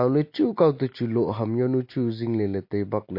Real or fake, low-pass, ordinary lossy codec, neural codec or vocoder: real; 5.4 kHz; MP3, 32 kbps; none